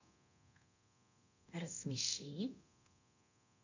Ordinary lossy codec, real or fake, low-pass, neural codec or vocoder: none; fake; 7.2 kHz; codec, 24 kHz, 0.9 kbps, DualCodec